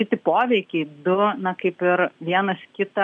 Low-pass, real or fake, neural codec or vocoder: 9.9 kHz; real; none